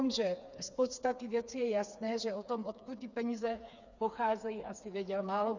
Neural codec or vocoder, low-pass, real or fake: codec, 16 kHz, 4 kbps, FreqCodec, smaller model; 7.2 kHz; fake